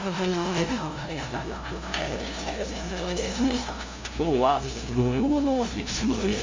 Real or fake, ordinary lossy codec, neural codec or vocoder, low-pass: fake; MP3, 64 kbps; codec, 16 kHz, 0.5 kbps, FunCodec, trained on LibriTTS, 25 frames a second; 7.2 kHz